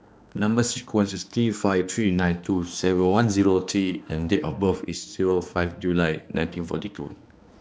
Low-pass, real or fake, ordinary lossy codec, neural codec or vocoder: none; fake; none; codec, 16 kHz, 2 kbps, X-Codec, HuBERT features, trained on balanced general audio